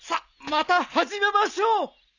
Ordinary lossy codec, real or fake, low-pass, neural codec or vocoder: none; real; 7.2 kHz; none